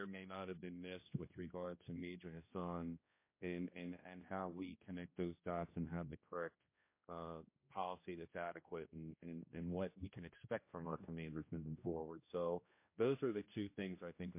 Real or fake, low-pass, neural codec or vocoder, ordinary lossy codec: fake; 3.6 kHz; codec, 16 kHz, 1 kbps, X-Codec, HuBERT features, trained on general audio; MP3, 24 kbps